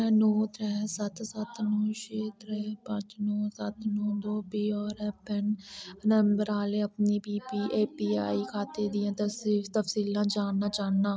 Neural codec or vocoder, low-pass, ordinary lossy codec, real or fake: none; none; none; real